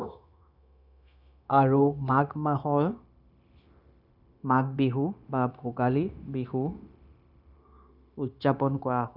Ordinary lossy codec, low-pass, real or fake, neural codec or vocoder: none; 5.4 kHz; fake; codec, 16 kHz, 0.9 kbps, LongCat-Audio-Codec